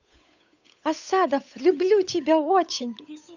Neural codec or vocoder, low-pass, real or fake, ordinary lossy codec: codec, 16 kHz, 8 kbps, FunCodec, trained on Chinese and English, 25 frames a second; 7.2 kHz; fake; none